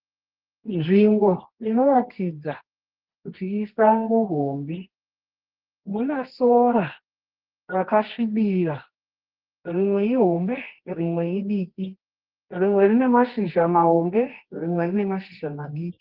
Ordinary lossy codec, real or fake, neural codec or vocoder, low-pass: Opus, 16 kbps; fake; codec, 24 kHz, 0.9 kbps, WavTokenizer, medium music audio release; 5.4 kHz